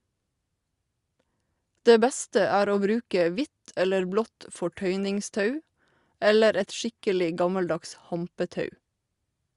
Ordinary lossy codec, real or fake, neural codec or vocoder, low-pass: Opus, 64 kbps; real; none; 10.8 kHz